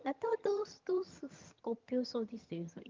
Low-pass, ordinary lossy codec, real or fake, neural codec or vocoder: 7.2 kHz; Opus, 24 kbps; fake; vocoder, 22.05 kHz, 80 mel bands, HiFi-GAN